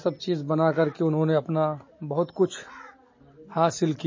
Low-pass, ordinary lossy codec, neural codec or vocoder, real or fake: 7.2 kHz; MP3, 32 kbps; none; real